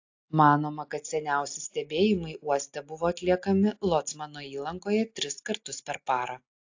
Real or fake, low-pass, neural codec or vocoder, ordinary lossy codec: real; 7.2 kHz; none; AAC, 48 kbps